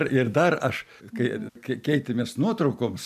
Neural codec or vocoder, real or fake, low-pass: none; real; 14.4 kHz